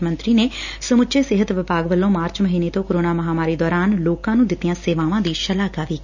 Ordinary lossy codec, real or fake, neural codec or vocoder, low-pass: none; real; none; 7.2 kHz